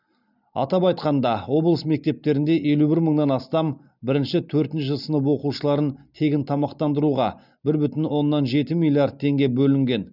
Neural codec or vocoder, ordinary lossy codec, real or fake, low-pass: none; none; real; 5.4 kHz